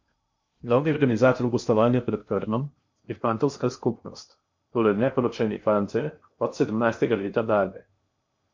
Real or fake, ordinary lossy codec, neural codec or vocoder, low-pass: fake; MP3, 48 kbps; codec, 16 kHz in and 24 kHz out, 0.6 kbps, FocalCodec, streaming, 2048 codes; 7.2 kHz